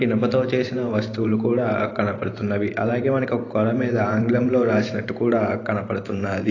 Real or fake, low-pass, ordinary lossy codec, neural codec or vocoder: fake; 7.2 kHz; AAC, 32 kbps; vocoder, 44.1 kHz, 128 mel bands every 512 samples, BigVGAN v2